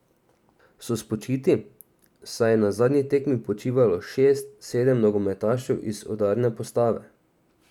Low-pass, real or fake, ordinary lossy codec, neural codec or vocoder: 19.8 kHz; fake; none; vocoder, 44.1 kHz, 128 mel bands every 512 samples, BigVGAN v2